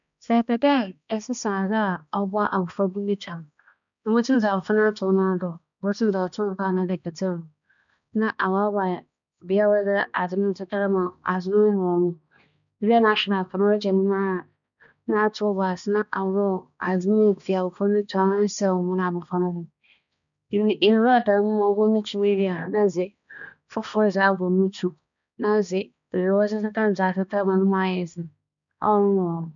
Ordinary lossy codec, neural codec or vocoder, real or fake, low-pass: none; codec, 16 kHz, 2 kbps, X-Codec, HuBERT features, trained on balanced general audio; fake; 7.2 kHz